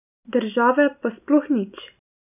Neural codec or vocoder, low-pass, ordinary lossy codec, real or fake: none; 3.6 kHz; none; real